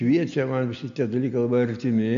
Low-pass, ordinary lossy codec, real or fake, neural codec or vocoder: 7.2 kHz; AAC, 64 kbps; real; none